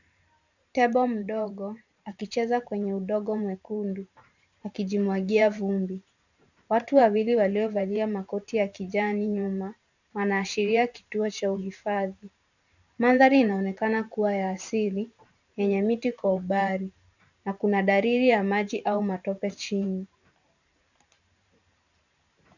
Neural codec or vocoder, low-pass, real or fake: vocoder, 44.1 kHz, 128 mel bands every 512 samples, BigVGAN v2; 7.2 kHz; fake